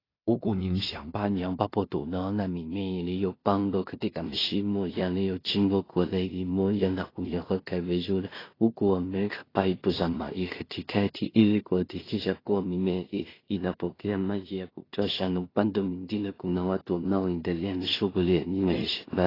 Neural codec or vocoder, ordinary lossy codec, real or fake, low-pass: codec, 16 kHz in and 24 kHz out, 0.4 kbps, LongCat-Audio-Codec, two codebook decoder; AAC, 24 kbps; fake; 5.4 kHz